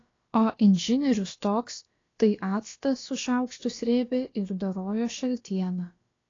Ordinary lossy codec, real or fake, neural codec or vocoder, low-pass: AAC, 32 kbps; fake; codec, 16 kHz, about 1 kbps, DyCAST, with the encoder's durations; 7.2 kHz